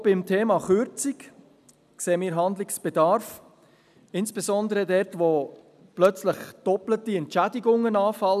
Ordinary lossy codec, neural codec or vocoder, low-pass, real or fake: none; none; 14.4 kHz; real